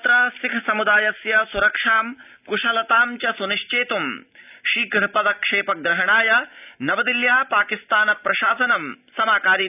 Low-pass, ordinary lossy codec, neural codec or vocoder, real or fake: 3.6 kHz; none; none; real